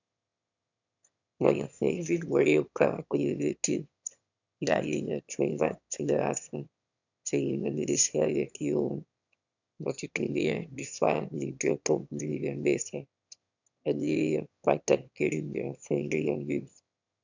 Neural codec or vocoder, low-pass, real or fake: autoencoder, 22.05 kHz, a latent of 192 numbers a frame, VITS, trained on one speaker; 7.2 kHz; fake